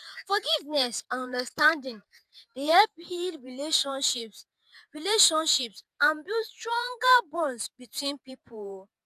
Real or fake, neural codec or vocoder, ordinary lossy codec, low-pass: fake; vocoder, 48 kHz, 128 mel bands, Vocos; none; 14.4 kHz